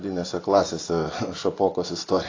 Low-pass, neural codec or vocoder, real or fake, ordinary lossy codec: 7.2 kHz; vocoder, 24 kHz, 100 mel bands, Vocos; fake; AAC, 32 kbps